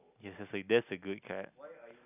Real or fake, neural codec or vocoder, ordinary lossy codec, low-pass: real; none; none; 3.6 kHz